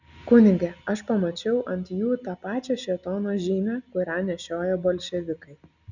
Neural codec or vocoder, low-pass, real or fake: none; 7.2 kHz; real